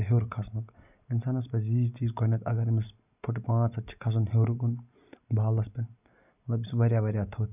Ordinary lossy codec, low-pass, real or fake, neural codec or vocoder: none; 3.6 kHz; real; none